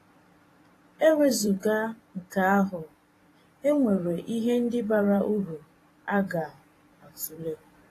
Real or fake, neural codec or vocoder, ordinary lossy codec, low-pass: fake; vocoder, 44.1 kHz, 128 mel bands every 512 samples, BigVGAN v2; AAC, 48 kbps; 14.4 kHz